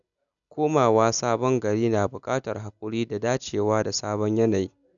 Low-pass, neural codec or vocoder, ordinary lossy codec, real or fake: 7.2 kHz; none; none; real